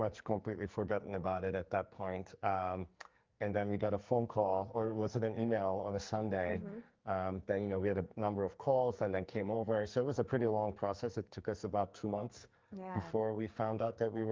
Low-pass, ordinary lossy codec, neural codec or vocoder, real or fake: 7.2 kHz; Opus, 24 kbps; codec, 44.1 kHz, 2.6 kbps, SNAC; fake